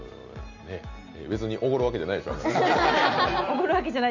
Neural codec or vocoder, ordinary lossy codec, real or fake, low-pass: none; none; real; 7.2 kHz